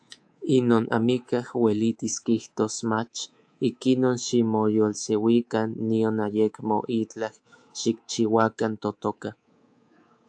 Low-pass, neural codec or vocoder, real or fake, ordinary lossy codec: 9.9 kHz; codec, 24 kHz, 3.1 kbps, DualCodec; fake; AAC, 64 kbps